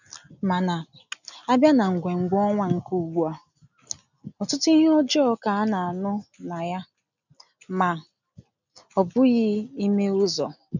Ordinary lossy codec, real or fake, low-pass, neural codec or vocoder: none; real; 7.2 kHz; none